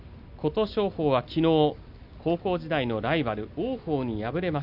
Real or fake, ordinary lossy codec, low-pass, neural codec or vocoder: real; none; 5.4 kHz; none